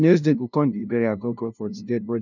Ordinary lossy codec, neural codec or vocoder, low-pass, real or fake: none; codec, 16 kHz, 0.5 kbps, FunCodec, trained on LibriTTS, 25 frames a second; 7.2 kHz; fake